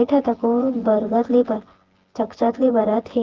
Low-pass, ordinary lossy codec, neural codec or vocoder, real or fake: 7.2 kHz; Opus, 16 kbps; vocoder, 24 kHz, 100 mel bands, Vocos; fake